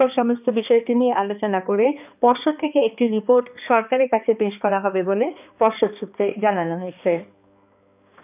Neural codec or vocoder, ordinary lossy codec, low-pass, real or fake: codec, 16 kHz, 2 kbps, X-Codec, HuBERT features, trained on balanced general audio; none; 3.6 kHz; fake